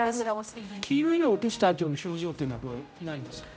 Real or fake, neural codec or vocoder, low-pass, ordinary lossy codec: fake; codec, 16 kHz, 0.5 kbps, X-Codec, HuBERT features, trained on general audio; none; none